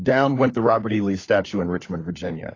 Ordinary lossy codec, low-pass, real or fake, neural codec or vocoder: AAC, 32 kbps; 7.2 kHz; fake; codec, 16 kHz, 4 kbps, FunCodec, trained on LibriTTS, 50 frames a second